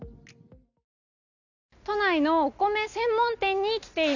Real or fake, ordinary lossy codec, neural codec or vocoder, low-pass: real; none; none; 7.2 kHz